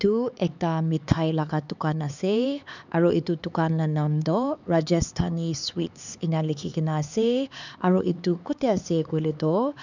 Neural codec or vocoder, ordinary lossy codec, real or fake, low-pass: codec, 16 kHz, 4 kbps, X-Codec, HuBERT features, trained on LibriSpeech; none; fake; 7.2 kHz